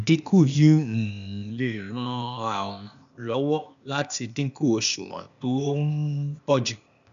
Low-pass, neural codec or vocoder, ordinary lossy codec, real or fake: 7.2 kHz; codec, 16 kHz, 0.8 kbps, ZipCodec; MP3, 96 kbps; fake